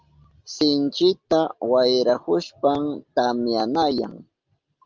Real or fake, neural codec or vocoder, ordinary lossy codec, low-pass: real; none; Opus, 32 kbps; 7.2 kHz